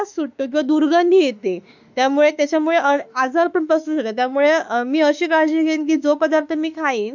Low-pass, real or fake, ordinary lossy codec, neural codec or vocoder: 7.2 kHz; fake; none; autoencoder, 48 kHz, 32 numbers a frame, DAC-VAE, trained on Japanese speech